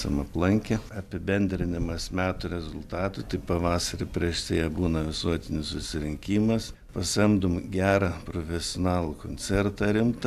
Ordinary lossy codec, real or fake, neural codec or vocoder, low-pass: AAC, 96 kbps; real; none; 14.4 kHz